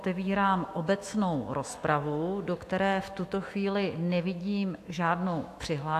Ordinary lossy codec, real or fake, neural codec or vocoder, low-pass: AAC, 64 kbps; fake; autoencoder, 48 kHz, 128 numbers a frame, DAC-VAE, trained on Japanese speech; 14.4 kHz